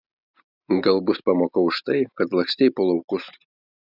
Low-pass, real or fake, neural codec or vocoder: 5.4 kHz; real; none